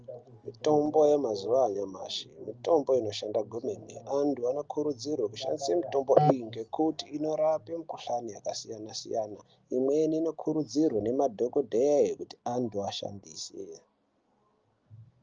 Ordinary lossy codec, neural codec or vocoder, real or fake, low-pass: Opus, 24 kbps; none; real; 7.2 kHz